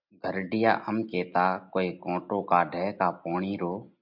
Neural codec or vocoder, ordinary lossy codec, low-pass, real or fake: none; MP3, 48 kbps; 5.4 kHz; real